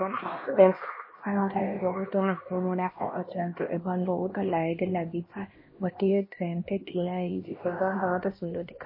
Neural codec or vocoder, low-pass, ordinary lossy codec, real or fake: codec, 16 kHz, 1 kbps, X-Codec, HuBERT features, trained on LibriSpeech; 5.4 kHz; MP3, 24 kbps; fake